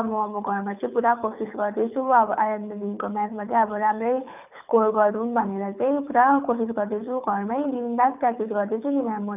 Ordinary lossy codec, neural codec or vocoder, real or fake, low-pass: none; codec, 24 kHz, 6 kbps, HILCodec; fake; 3.6 kHz